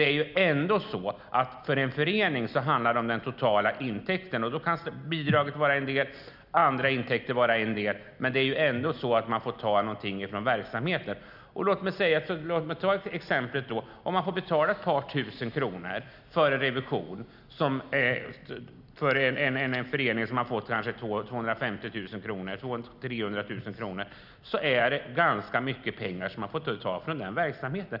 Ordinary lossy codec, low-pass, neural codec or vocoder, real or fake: none; 5.4 kHz; none; real